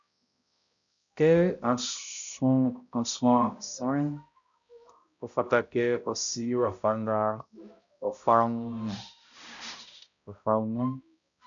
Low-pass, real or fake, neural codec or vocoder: 7.2 kHz; fake; codec, 16 kHz, 0.5 kbps, X-Codec, HuBERT features, trained on balanced general audio